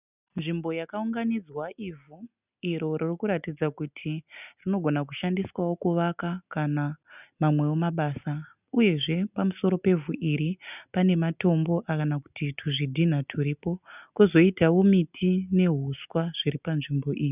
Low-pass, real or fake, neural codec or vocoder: 3.6 kHz; real; none